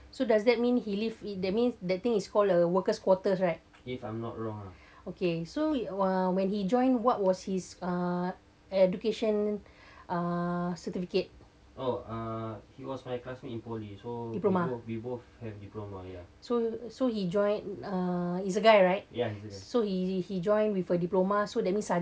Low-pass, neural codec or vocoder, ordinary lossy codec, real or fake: none; none; none; real